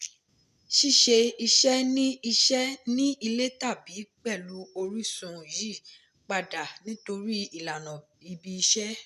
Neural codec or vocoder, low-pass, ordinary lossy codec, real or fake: none; 14.4 kHz; none; real